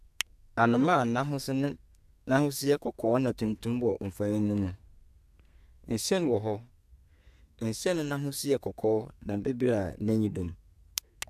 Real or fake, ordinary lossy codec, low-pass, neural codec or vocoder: fake; AAC, 96 kbps; 14.4 kHz; codec, 32 kHz, 1.9 kbps, SNAC